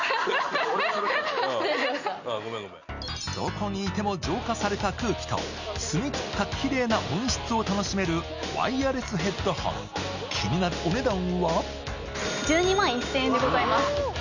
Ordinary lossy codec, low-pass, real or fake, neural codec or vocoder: none; 7.2 kHz; real; none